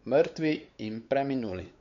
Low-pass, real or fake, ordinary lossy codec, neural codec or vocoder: 7.2 kHz; fake; MP3, 48 kbps; codec, 16 kHz, 4 kbps, X-Codec, WavLM features, trained on Multilingual LibriSpeech